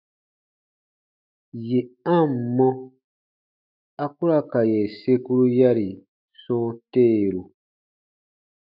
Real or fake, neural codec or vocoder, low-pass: fake; autoencoder, 48 kHz, 128 numbers a frame, DAC-VAE, trained on Japanese speech; 5.4 kHz